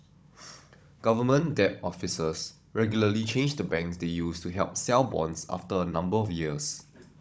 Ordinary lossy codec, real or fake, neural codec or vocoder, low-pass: none; fake; codec, 16 kHz, 16 kbps, FunCodec, trained on Chinese and English, 50 frames a second; none